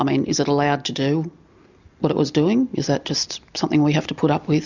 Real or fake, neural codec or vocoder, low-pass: real; none; 7.2 kHz